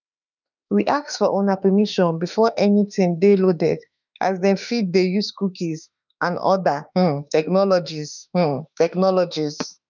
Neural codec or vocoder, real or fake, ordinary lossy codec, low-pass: autoencoder, 48 kHz, 32 numbers a frame, DAC-VAE, trained on Japanese speech; fake; none; 7.2 kHz